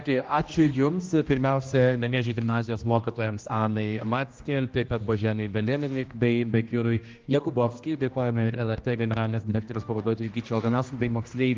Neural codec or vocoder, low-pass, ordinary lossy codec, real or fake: codec, 16 kHz, 1 kbps, X-Codec, HuBERT features, trained on general audio; 7.2 kHz; Opus, 24 kbps; fake